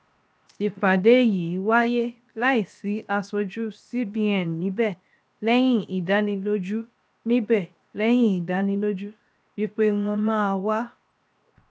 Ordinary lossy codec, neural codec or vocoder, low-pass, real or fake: none; codec, 16 kHz, 0.7 kbps, FocalCodec; none; fake